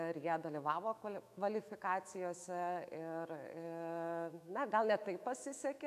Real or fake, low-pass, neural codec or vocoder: fake; 14.4 kHz; autoencoder, 48 kHz, 128 numbers a frame, DAC-VAE, trained on Japanese speech